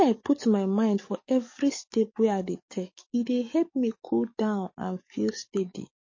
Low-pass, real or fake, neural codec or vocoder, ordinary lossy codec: 7.2 kHz; fake; codec, 44.1 kHz, 7.8 kbps, DAC; MP3, 32 kbps